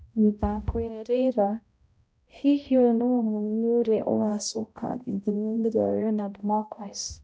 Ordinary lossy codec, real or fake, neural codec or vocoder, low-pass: none; fake; codec, 16 kHz, 0.5 kbps, X-Codec, HuBERT features, trained on balanced general audio; none